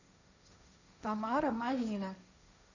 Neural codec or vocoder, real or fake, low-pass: codec, 16 kHz, 1.1 kbps, Voila-Tokenizer; fake; 7.2 kHz